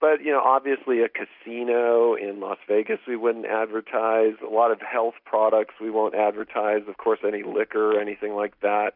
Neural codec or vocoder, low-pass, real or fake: none; 5.4 kHz; real